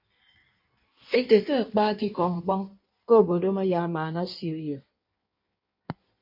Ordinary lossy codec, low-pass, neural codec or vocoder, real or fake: MP3, 32 kbps; 5.4 kHz; codec, 16 kHz in and 24 kHz out, 1.1 kbps, FireRedTTS-2 codec; fake